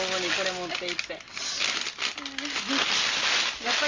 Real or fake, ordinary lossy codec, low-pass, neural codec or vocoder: real; Opus, 32 kbps; 7.2 kHz; none